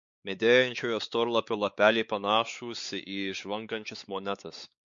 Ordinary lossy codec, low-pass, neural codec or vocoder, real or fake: MP3, 48 kbps; 7.2 kHz; codec, 16 kHz, 4 kbps, X-Codec, WavLM features, trained on Multilingual LibriSpeech; fake